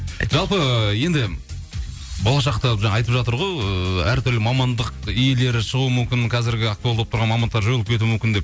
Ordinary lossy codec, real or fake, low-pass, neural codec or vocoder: none; real; none; none